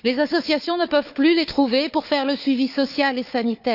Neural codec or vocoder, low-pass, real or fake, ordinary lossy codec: codec, 16 kHz, 4 kbps, FunCodec, trained on Chinese and English, 50 frames a second; 5.4 kHz; fake; none